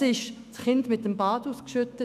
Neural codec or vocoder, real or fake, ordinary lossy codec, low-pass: autoencoder, 48 kHz, 128 numbers a frame, DAC-VAE, trained on Japanese speech; fake; none; 14.4 kHz